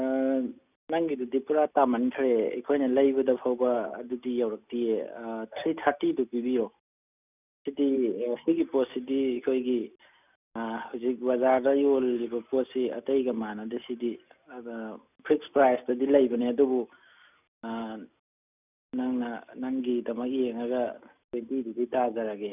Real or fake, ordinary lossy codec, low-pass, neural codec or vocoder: real; none; 3.6 kHz; none